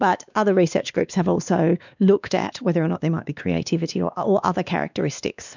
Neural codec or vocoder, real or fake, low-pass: codec, 16 kHz, 2 kbps, X-Codec, WavLM features, trained on Multilingual LibriSpeech; fake; 7.2 kHz